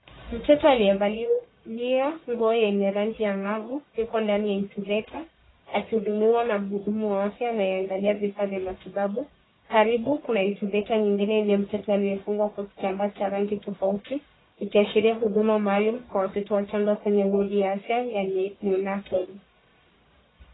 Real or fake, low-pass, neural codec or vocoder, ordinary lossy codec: fake; 7.2 kHz; codec, 44.1 kHz, 1.7 kbps, Pupu-Codec; AAC, 16 kbps